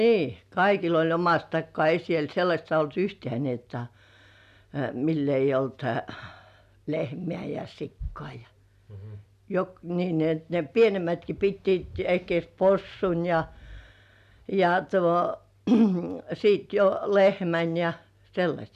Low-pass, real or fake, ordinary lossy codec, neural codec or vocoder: 14.4 kHz; real; none; none